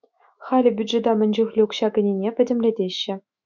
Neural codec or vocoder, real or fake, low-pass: autoencoder, 48 kHz, 128 numbers a frame, DAC-VAE, trained on Japanese speech; fake; 7.2 kHz